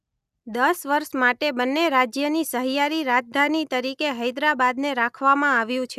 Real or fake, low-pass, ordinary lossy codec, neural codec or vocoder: real; 14.4 kHz; none; none